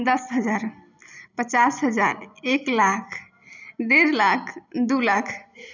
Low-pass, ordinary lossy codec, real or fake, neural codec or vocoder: 7.2 kHz; none; real; none